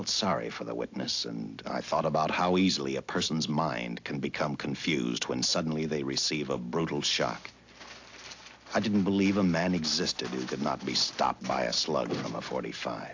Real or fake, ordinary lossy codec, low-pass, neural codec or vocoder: real; AAC, 48 kbps; 7.2 kHz; none